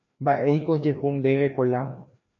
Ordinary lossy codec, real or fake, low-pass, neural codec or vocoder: AAC, 48 kbps; fake; 7.2 kHz; codec, 16 kHz, 1 kbps, FreqCodec, larger model